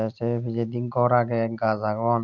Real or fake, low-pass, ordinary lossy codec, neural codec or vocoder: real; 7.2 kHz; none; none